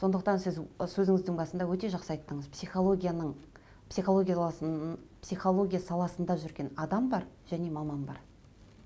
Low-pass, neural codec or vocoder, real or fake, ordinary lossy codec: none; none; real; none